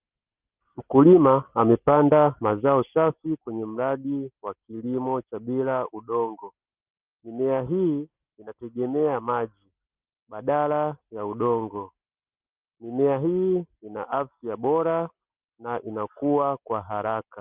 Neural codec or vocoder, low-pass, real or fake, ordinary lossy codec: none; 3.6 kHz; real; Opus, 32 kbps